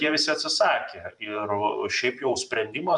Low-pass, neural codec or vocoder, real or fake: 10.8 kHz; vocoder, 44.1 kHz, 128 mel bands every 512 samples, BigVGAN v2; fake